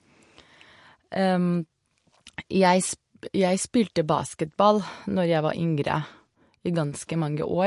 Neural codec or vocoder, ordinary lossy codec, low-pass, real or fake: none; MP3, 48 kbps; 14.4 kHz; real